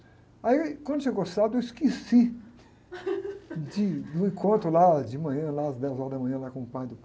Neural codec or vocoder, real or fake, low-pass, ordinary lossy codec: none; real; none; none